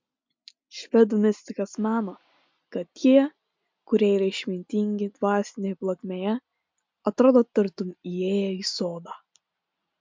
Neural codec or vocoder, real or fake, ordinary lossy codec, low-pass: none; real; MP3, 64 kbps; 7.2 kHz